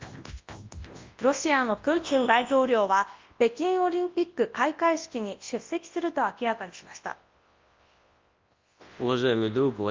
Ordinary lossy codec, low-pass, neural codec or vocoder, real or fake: Opus, 32 kbps; 7.2 kHz; codec, 24 kHz, 0.9 kbps, WavTokenizer, large speech release; fake